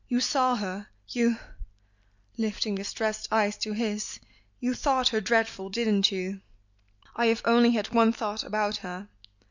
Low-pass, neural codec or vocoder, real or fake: 7.2 kHz; none; real